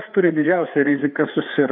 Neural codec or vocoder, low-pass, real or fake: codec, 16 kHz, 4 kbps, FreqCodec, larger model; 5.4 kHz; fake